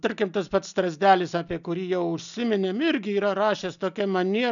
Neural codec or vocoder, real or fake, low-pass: none; real; 7.2 kHz